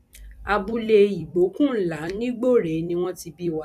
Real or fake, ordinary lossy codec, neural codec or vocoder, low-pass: fake; none; vocoder, 44.1 kHz, 128 mel bands every 256 samples, BigVGAN v2; 14.4 kHz